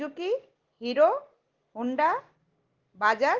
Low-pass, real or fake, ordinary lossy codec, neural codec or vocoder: 7.2 kHz; real; Opus, 16 kbps; none